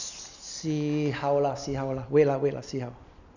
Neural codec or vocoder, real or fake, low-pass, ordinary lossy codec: none; real; 7.2 kHz; none